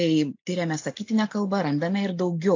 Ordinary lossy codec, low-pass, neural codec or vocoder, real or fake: AAC, 48 kbps; 7.2 kHz; none; real